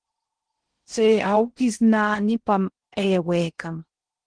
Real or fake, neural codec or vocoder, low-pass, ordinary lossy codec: fake; codec, 16 kHz in and 24 kHz out, 0.6 kbps, FocalCodec, streaming, 2048 codes; 9.9 kHz; Opus, 16 kbps